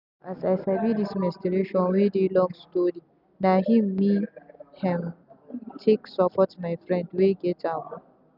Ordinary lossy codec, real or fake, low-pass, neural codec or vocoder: none; real; 5.4 kHz; none